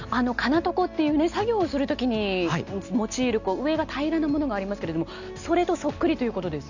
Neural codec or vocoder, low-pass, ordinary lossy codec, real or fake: none; 7.2 kHz; none; real